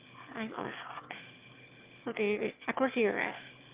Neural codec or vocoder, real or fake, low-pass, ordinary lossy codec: autoencoder, 22.05 kHz, a latent of 192 numbers a frame, VITS, trained on one speaker; fake; 3.6 kHz; Opus, 24 kbps